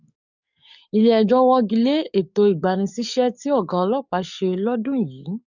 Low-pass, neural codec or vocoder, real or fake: 7.2 kHz; codec, 44.1 kHz, 7.8 kbps, DAC; fake